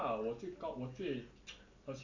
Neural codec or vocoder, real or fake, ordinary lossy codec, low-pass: none; real; none; 7.2 kHz